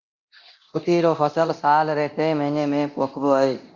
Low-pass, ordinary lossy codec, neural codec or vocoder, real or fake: 7.2 kHz; Opus, 64 kbps; codec, 24 kHz, 0.9 kbps, DualCodec; fake